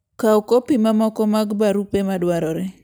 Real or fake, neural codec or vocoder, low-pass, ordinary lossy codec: real; none; none; none